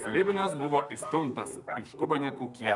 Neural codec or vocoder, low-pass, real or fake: codec, 32 kHz, 1.9 kbps, SNAC; 10.8 kHz; fake